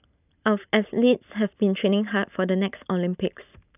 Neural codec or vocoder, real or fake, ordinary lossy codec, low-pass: none; real; none; 3.6 kHz